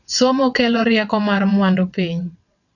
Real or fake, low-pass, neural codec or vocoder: fake; 7.2 kHz; vocoder, 22.05 kHz, 80 mel bands, WaveNeXt